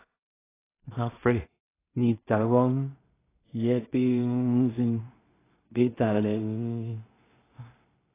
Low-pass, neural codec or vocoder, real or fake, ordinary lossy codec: 3.6 kHz; codec, 16 kHz in and 24 kHz out, 0.4 kbps, LongCat-Audio-Codec, two codebook decoder; fake; AAC, 16 kbps